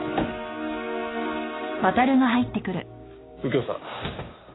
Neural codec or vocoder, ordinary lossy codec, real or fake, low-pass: codec, 16 kHz, 6 kbps, DAC; AAC, 16 kbps; fake; 7.2 kHz